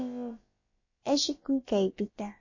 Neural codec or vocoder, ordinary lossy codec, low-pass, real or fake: codec, 16 kHz, about 1 kbps, DyCAST, with the encoder's durations; MP3, 32 kbps; 7.2 kHz; fake